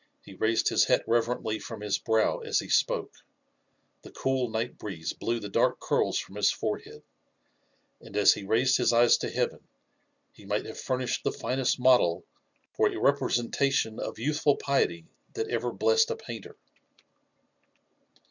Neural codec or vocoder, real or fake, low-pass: none; real; 7.2 kHz